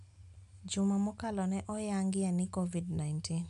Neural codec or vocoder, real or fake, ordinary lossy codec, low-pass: none; real; none; 10.8 kHz